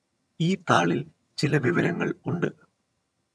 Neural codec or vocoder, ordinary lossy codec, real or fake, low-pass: vocoder, 22.05 kHz, 80 mel bands, HiFi-GAN; none; fake; none